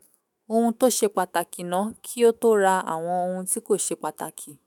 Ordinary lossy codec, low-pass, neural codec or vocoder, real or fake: none; none; autoencoder, 48 kHz, 128 numbers a frame, DAC-VAE, trained on Japanese speech; fake